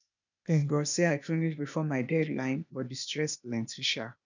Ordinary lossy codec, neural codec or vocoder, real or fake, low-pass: none; codec, 16 kHz, 0.8 kbps, ZipCodec; fake; 7.2 kHz